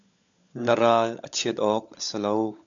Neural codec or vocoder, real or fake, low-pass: codec, 16 kHz, 16 kbps, FunCodec, trained on LibriTTS, 50 frames a second; fake; 7.2 kHz